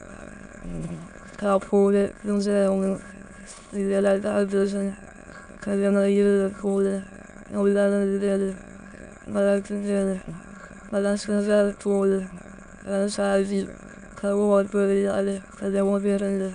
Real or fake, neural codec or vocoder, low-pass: fake; autoencoder, 22.05 kHz, a latent of 192 numbers a frame, VITS, trained on many speakers; 9.9 kHz